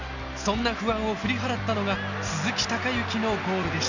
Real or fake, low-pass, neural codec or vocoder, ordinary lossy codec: real; 7.2 kHz; none; none